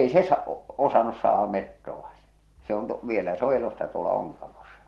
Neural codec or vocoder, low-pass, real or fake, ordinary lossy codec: none; 19.8 kHz; real; Opus, 16 kbps